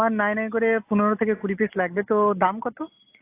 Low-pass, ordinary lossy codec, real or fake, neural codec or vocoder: 3.6 kHz; AAC, 24 kbps; real; none